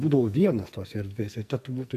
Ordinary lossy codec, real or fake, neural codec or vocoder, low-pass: MP3, 96 kbps; fake; codec, 44.1 kHz, 2.6 kbps, SNAC; 14.4 kHz